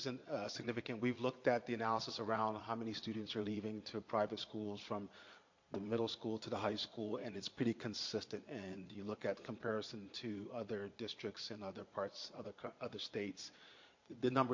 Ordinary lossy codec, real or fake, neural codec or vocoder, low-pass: MP3, 48 kbps; fake; vocoder, 22.05 kHz, 80 mel bands, Vocos; 7.2 kHz